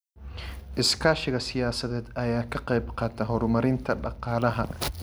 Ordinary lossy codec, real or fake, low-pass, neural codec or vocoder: none; real; none; none